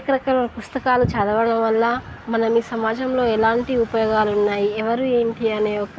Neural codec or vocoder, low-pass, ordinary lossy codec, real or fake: none; none; none; real